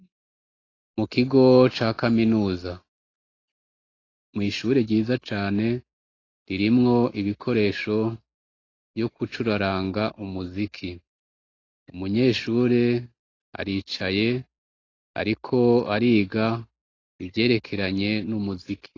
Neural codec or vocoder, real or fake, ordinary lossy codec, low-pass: none; real; AAC, 32 kbps; 7.2 kHz